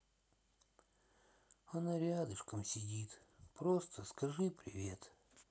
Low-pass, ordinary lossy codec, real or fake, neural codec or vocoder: none; none; real; none